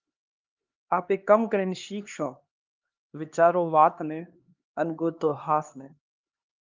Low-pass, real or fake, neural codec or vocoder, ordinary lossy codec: 7.2 kHz; fake; codec, 16 kHz, 2 kbps, X-Codec, HuBERT features, trained on LibriSpeech; Opus, 24 kbps